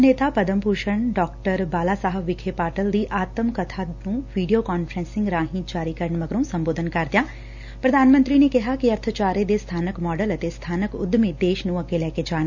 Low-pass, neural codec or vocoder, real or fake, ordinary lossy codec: 7.2 kHz; none; real; none